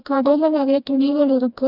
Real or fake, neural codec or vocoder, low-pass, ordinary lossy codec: fake; codec, 16 kHz, 1 kbps, FreqCodec, smaller model; 5.4 kHz; none